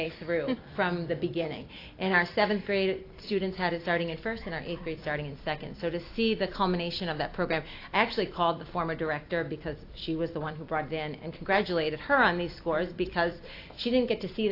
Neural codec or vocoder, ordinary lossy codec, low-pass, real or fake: vocoder, 44.1 kHz, 128 mel bands every 256 samples, BigVGAN v2; AAC, 32 kbps; 5.4 kHz; fake